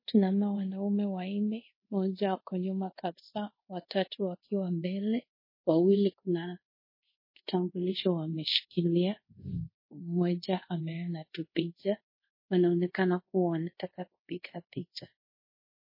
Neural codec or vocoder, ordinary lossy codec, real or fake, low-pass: codec, 24 kHz, 0.5 kbps, DualCodec; MP3, 24 kbps; fake; 5.4 kHz